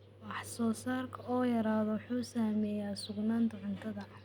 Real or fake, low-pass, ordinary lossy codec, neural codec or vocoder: real; 19.8 kHz; Opus, 64 kbps; none